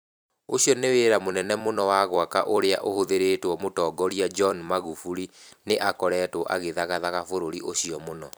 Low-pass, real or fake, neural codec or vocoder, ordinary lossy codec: none; fake; vocoder, 44.1 kHz, 128 mel bands every 256 samples, BigVGAN v2; none